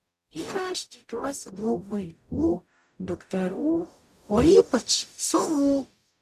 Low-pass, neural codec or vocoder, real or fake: 14.4 kHz; codec, 44.1 kHz, 0.9 kbps, DAC; fake